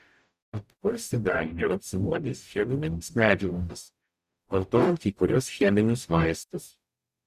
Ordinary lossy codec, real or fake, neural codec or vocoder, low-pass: Opus, 64 kbps; fake; codec, 44.1 kHz, 0.9 kbps, DAC; 14.4 kHz